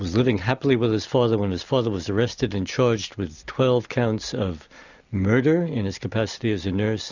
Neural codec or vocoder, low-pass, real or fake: none; 7.2 kHz; real